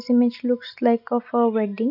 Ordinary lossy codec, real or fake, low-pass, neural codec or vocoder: none; real; 5.4 kHz; none